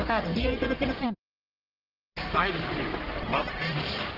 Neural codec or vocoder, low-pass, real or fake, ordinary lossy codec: codec, 44.1 kHz, 1.7 kbps, Pupu-Codec; 5.4 kHz; fake; Opus, 16 kbps